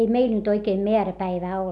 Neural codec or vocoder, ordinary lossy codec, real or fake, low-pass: none; none; real; none